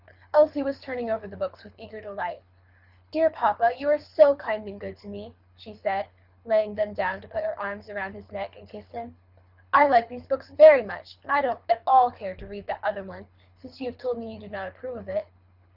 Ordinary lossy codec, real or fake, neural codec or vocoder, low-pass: Opus, 64 kbps; fake; codec, 24 kHz, 6 kbps, HILCodec; 5.4 kHz